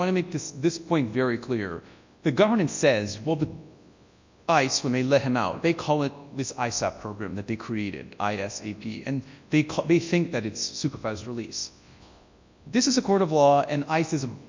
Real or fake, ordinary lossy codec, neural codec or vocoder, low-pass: fake; MP3, 64 kbps; codec, 24 kHz, 0.9 kbps, WavTokenizer, large speech release; 7.2 kHz